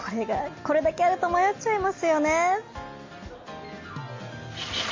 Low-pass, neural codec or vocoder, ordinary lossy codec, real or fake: 7.2 kHz; none; MP3, 32 kbps; real